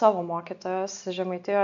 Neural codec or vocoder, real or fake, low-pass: none; real; 7.2 kHz